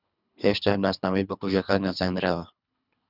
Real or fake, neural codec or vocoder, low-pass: fake; codec, 24 kHz, 3 kbps, HILCodec; 5.4 kHz